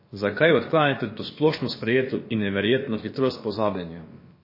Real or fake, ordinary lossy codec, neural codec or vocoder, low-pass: fake; MP3, 24 kbps; codec, 16 kHz, about 1 kbps, DyCAST, with the encoder's durations; 5.4 kHz